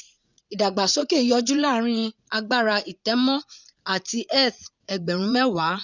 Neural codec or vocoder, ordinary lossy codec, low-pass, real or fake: vocoder, 44.1 kHz, 80 mel bands, Vocos; none; 7.2 kHz; fake